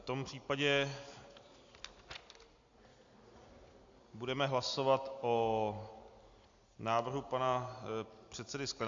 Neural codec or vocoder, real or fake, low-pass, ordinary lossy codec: none; real; 7.2 kHz; AAC, 64 kbps